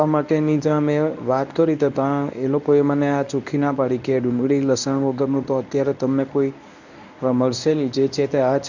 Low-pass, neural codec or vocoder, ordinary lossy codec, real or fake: 7.2 kHz; codec, 24 kHz, 0.9 kbps, WavTokenizer, medium speech release version 1; none; fake